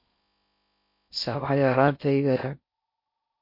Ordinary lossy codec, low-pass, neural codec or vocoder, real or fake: MP3, 32 kbps; 5.4 kHz; codec, 16 kHz in and 24 kHz out, 0.6 kbps, FocalCodec, streaming, 4096 codes; fake